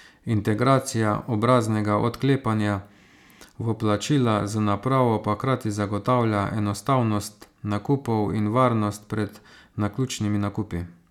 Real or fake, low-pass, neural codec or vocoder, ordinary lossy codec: real; 19.8 kHz; none; none